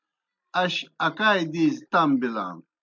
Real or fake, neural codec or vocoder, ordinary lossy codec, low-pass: real; none; MP3, 64 kbps; 7.2 kHz